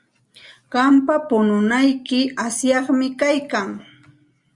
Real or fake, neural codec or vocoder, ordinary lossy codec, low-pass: real; none; Opus, 64 kbps; 10.8 kHz